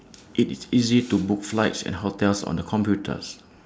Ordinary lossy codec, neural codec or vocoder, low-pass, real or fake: none; none; none; real